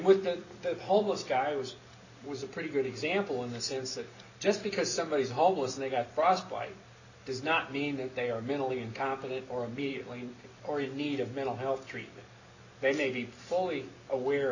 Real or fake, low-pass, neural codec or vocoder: real; 7.2 kHz; none